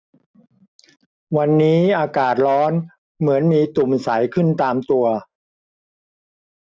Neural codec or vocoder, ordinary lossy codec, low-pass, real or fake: none; none; none; real